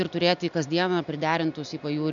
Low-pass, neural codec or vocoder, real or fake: 7.2 kHz; none; real